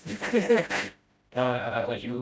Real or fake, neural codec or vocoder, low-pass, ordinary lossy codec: fake; codec, 16 kHz, 0.5 kbps, FreqCodec, smaller model; none; none